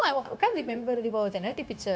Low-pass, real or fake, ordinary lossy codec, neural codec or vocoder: none; fake; none; codec, 16 kHz, 0.9 kbps, LongCat-Audio-Codec